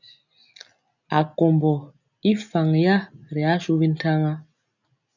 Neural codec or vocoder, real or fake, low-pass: none; real; 7.2 kHz